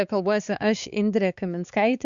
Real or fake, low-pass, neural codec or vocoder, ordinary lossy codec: fake; 7.2 kHz; codec, 16 kHz, 4 kbps, X-Codec, HuBERT features, trained on balanced general audio; Opus, 64 kbps